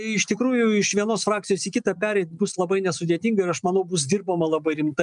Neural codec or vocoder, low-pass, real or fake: none; 9.9 kHz; real